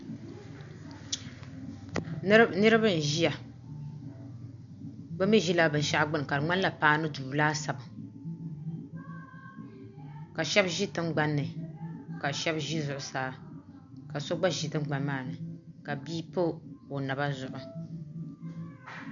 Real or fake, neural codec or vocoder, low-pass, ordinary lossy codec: real; none; 7.2 kHz; MP3, 96 kbps